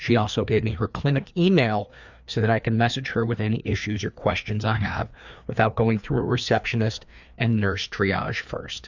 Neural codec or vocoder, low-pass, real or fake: codec, 16 kHz, 2 kbps, FreqCodec, larger model; 7.2 kHz; fake